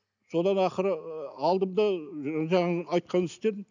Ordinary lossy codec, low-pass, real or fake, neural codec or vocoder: none; 7.2 kHz; real; none